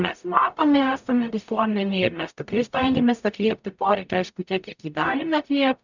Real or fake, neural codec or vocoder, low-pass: fake; codec, 44.1 kHz, 0.9 kbps, DAC; 7.2 kHz